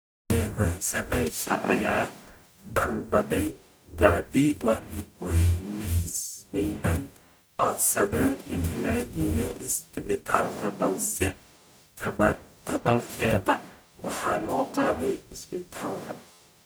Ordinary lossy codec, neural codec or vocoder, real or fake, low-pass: none; codec, 44.1 kHz, 0.9 kbps, DAC; fake; none